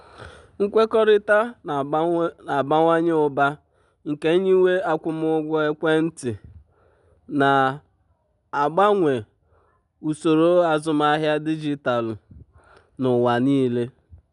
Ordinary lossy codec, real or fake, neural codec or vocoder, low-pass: none; real; none; 10.8 kHz